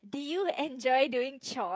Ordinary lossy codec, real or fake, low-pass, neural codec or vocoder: none; fake; none; codec, 16 kHz, 16 kbps, FreqCodec, smaller model